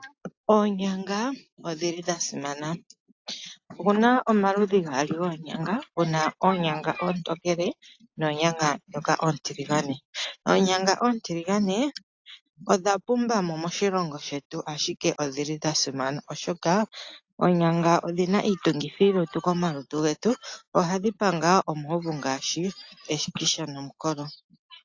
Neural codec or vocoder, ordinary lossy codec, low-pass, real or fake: none; AAC, 48 kbps; 7.2 kHz; real